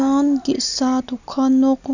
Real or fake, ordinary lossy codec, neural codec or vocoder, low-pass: real; none; none; 7.2 kHz